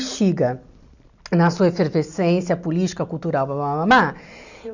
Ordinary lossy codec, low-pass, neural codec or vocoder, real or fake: none; 7.2 kHz; none; real